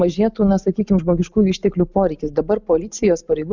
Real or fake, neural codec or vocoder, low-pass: real; none; 7.2 kHz